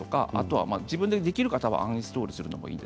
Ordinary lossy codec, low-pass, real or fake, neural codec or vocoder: none; none; real; none